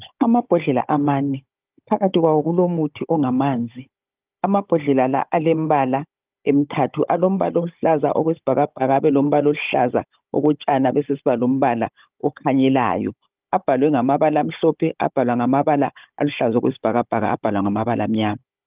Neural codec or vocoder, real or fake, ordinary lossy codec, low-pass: codec, 16 kHz, 16 kbps, FunCodec, trained on Chinese and English, 50 frames a second; fake; Opus, 32 kbps; 3.6 kHz